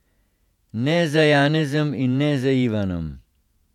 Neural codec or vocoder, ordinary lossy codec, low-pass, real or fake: vocoder, 48 kHz, 128 mel bands, Vocos; none; 19.8 kHz; fake